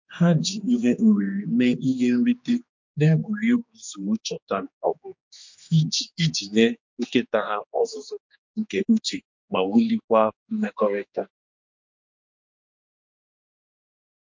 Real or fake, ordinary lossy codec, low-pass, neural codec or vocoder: fake; MP3, 48 kbps; 7.2 kHz; codec, 16 kHz, 2 kbps, X-Codec, HuBERT features, trained on general audio